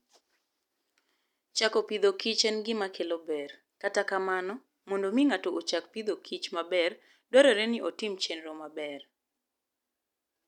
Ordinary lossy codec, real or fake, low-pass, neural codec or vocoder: none; real; 19.8 kHz; none